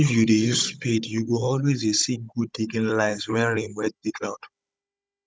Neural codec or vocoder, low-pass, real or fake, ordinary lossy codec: codec, 16 kHz, 16 kbps, FunCodec, trained on Chinese and English, 50 frames a second; none; fake; none